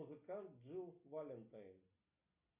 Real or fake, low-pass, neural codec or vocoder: real; 3.6 kHz; none